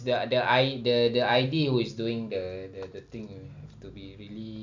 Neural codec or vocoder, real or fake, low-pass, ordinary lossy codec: none; real; 7.2 kHz; none